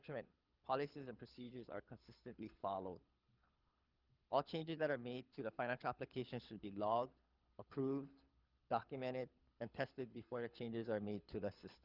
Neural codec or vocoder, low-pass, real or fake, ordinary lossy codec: codec, 24 kHz, 6 kbps, HILCodec; 5.4 kHz; fake; Opus, 32 kbps